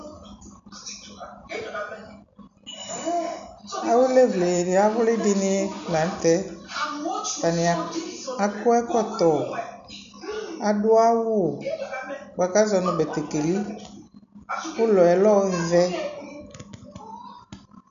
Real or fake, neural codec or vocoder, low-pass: real; none; 7.2 kHz